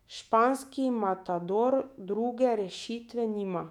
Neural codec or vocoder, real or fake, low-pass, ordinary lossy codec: autoencoder, 48 kHz, 128 numbers a frame, DAC-VAE, trained on Japanese speech; fake; 19.8 kHz; none